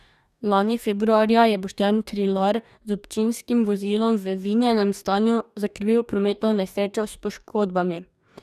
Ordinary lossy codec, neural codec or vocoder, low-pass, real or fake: none; codec, 44.1 kHz, 2.6 kbps, DAC; 14.4 kHz; fake